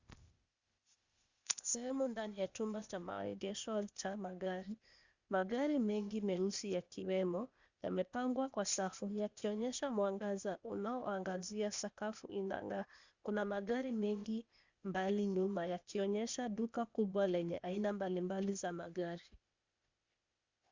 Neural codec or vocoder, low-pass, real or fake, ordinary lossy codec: codec, 16 kHz, 0.8 kbps, ZipCodec; 7.2 kHz; fake; Opus, 64 kbps